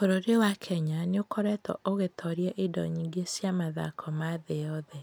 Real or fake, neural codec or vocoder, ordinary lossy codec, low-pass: real; none; none; none